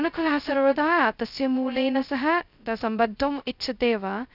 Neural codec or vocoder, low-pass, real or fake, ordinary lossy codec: codec, 16 kHz, 0.2 kbps, FocalCodec; 5.4 kHz; fake; none